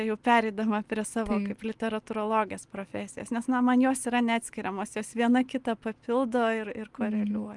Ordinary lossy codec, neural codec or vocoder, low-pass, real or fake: Opus, 32 kbps; none; 10.8 kHz; real